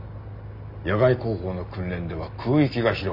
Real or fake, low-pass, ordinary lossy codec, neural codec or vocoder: real; 5.4 kHz; none; none